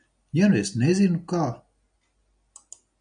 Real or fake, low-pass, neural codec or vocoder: real; 9.9 kHz; none